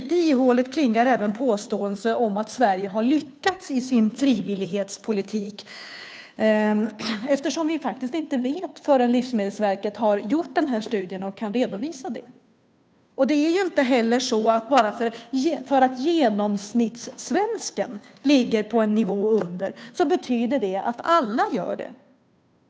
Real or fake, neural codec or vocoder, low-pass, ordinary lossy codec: fake; codec, 16 kHz, 2 kbps, FunCodec, trained on Chinese and English, 25 frames a second; none; none